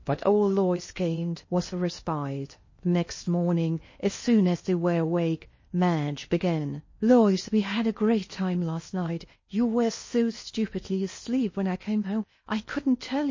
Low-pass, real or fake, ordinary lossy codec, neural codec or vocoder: 7.2 kHz; fake; MP3, 32 kbps; codec, 16 kHz in and 24 kHz out, 0.8 kbps, FocalCodec, streaming, 65536 codes